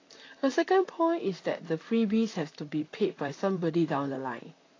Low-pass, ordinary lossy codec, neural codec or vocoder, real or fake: 7.2 kHz; AAC, 32 kbps; vocoder, 44.1 kHz, 128 mel bands, Pupu-Vocoder; fake